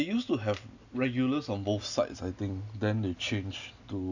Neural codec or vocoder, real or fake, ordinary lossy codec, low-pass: none; real; none; 7.2 kHz